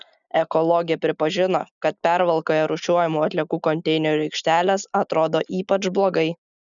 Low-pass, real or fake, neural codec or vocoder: 7.2 kHz; real; none